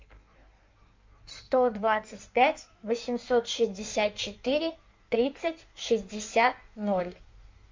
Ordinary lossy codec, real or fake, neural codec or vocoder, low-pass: MP3, 64 kbps; fake; codec, 16 kHz in and 24 kHz out, 1.1 kbps, FireRedTTS-2 codec; 7.2 kHz